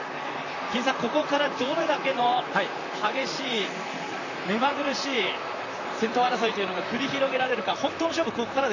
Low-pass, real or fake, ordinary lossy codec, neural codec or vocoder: 7.2 kHz; fake; AAC, 48 kbps; vocoder, 44.1 kHz, 128 mel bands, Pupu-Vocoder